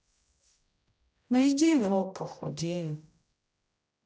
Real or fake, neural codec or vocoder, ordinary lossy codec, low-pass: fake; codec, 16 kHz, 0.5 kbps, X-Codec, HuBERT features, trained on general audio; none; none